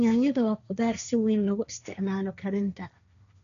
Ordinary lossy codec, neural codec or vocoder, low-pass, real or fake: none; codec, 16 kHz, 1.1 kbps, Voila-Tokenizer; 7.2 kHz; fake